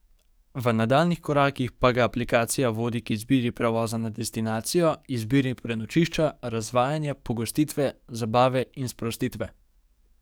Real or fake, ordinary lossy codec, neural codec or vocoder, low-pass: fake; none; codec, 44.1 kHz, 7.8 kbps, DAC; none